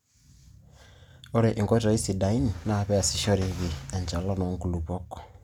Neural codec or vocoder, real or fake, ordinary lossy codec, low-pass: none; real; none; 19.8 kHz